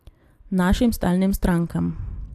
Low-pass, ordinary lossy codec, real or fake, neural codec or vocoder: 14.4 kHz; AAC, 96 kbps; real; none